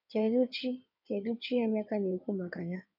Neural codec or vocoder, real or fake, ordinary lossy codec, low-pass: codec, 16 kHz in and 24 kHz out, 2.2 kbps, FireRedTTS-2 codec; fake; none; 5.4 kHz